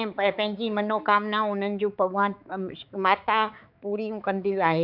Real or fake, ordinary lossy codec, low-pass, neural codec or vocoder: fake; none; 5.4 kHz; codec, 16 kHz, 4 kbps, X-Codec, HuBERT features, trained on balanced general audio